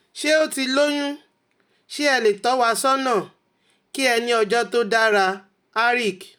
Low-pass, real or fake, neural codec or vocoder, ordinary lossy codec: none; real; none; none